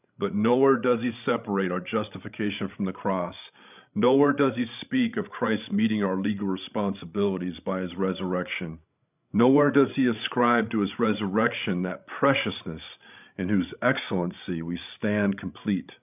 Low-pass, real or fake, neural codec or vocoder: 3.6 kHz; fake; codec, 16 kHz, 16 kbps, FreqCodec, larger model